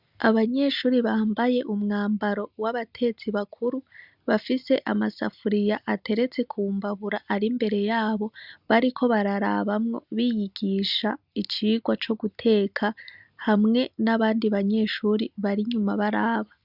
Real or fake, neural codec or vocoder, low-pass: real; none; 5.4 kHz